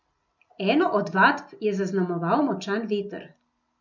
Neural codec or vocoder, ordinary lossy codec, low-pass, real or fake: none; none; 7.2 kHz; real